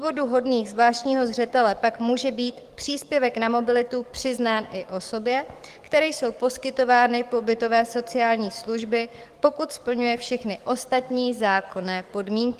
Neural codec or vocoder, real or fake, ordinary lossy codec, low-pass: codec, 44.1 kHz, 7.8 kbps, DAC; fake; Opus, 24 kbps; 14.4 kHz